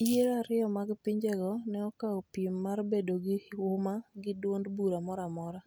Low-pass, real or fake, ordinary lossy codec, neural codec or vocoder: none; real; none; none